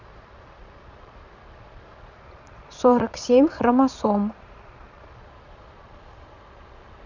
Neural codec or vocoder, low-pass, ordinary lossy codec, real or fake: vocoder, 22.05 kHz, 80 mel bands, Vocos; 7.2 kHz; none; fake